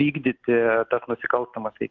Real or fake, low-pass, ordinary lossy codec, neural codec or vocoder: real; 7.2 kHz; Opus, 32 kbps; none